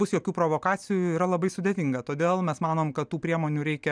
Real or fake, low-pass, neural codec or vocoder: real; 9.9 kHz; none